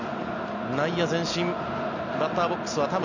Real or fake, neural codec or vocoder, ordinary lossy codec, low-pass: real; none; none; 7.2 kHz